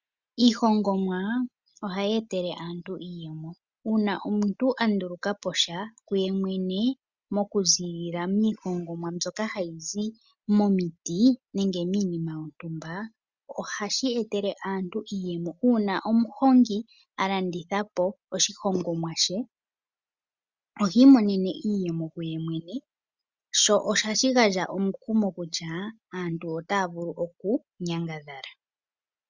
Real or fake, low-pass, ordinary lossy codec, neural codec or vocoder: real; 7.2 kHz; Opus, 64 kbps; none